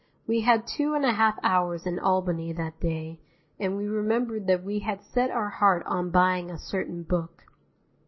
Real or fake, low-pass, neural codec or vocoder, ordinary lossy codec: real; 7.2 kHz; none; MP3, 24 kbps